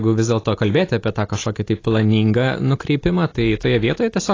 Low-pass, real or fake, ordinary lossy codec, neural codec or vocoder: 7.2 kHz; real; AAC, 32 kbps; none